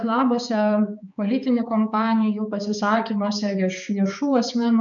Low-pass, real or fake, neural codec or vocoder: 7.2 kHz; fake; codec, 16 kHz, 4 kbps, X-Codec, HuBERT features, trained on balanced general audio